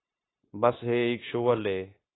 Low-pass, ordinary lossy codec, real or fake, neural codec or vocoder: 7.2 kHz; AAC, 16 kbps; fake; codec, 16 kHz, 0.9 kbps, LongCat-Audio-Codec